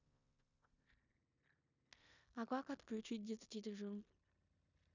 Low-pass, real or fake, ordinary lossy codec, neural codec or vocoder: 7.2 kHz; fake; none; codec, 16 kHz in and 24 kHz out, 0.9 kbps, LongCat-Audio-Codec, four codebook decoder